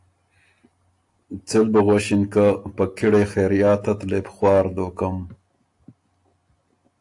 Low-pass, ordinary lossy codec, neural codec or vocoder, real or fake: 10.8 kHz; AAC, 64 kbps; none; real